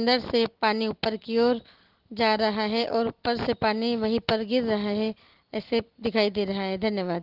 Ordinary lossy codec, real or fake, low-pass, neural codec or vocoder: Opus, 16 kbps; real; 5.4 kHz; none